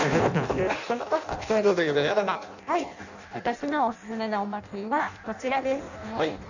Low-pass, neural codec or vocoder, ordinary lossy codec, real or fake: 7.2 kHz; codec, 16 kHz in and 24 kHz out, 0.6 kbps, FireRedTTS-2 codec; none; fake